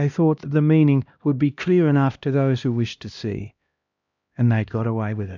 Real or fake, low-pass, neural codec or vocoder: fake; 7.2 kHz; codec, 16 kHz, 1 kbps, X-Codec, HuBERT features, trained on LibriSpeech